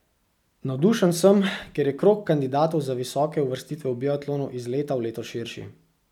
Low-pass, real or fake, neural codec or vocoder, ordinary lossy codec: 19.8 kHz; real; none; none